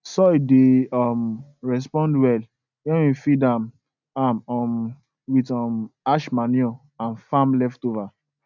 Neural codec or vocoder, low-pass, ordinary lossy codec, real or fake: none; 7.2 kHz; none; real